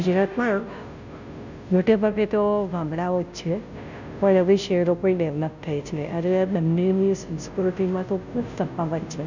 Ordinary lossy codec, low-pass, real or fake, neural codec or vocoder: none; 7.2 kHz; fake; codec, 16 kHz, 0.5 kbps, FunCodec, trained on Chinese and English, 25 frames a second